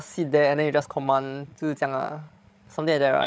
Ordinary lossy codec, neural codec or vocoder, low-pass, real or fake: none; codec, 16 kHz, 16 kbps, FreqCodec, larger model; none; fake